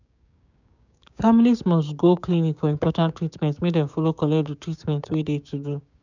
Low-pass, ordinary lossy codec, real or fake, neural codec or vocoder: 7.2 kHz; none; fake; codec, 16 kHz, 6 kbps, DAC